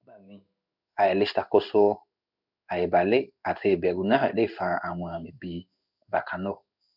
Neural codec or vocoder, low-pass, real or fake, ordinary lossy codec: codec, 16 kHz in and 24 kHz out, 1 kbps, XY-Tokenizer; 5.4 kHz; fake; none